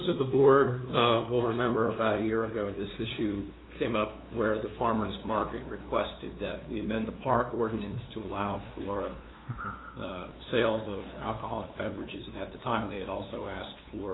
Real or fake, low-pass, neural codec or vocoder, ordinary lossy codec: fake; 7.2 kHz; codec, 16 kHz, 2 kbps, FunCodec, trained on LibriTTS, 25 frames a second; AAC, 16 kbps